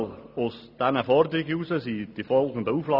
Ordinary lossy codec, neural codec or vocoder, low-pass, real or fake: none; none; 5.4 kHz; real